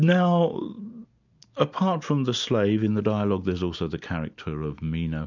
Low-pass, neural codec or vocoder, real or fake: 7.2 kHz; none; real